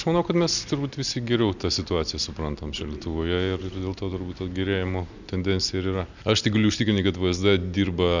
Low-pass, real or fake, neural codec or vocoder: 7.2 kHz; real; none